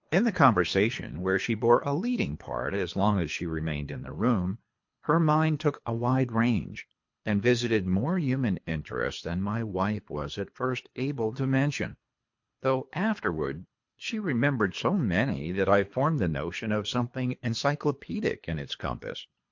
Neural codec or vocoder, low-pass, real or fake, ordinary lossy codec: codec, 24 kHz, 3 kbps, HILCodec; 7.2 kHz; fake; MP3, 48 kbps